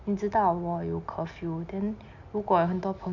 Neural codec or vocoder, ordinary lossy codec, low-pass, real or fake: none; MP3, 64 kbps; 7.2 kHz; real